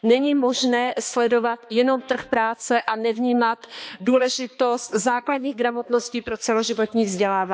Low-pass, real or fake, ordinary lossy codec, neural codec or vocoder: none; fake; none; codec, 16 kHz, 2 kbps, X-Codec, HuBERT features, trained on balanced general audio